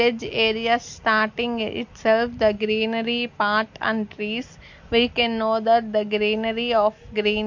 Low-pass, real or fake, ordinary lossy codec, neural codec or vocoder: 7.2 kHz; real; MP3, 48 kbps; none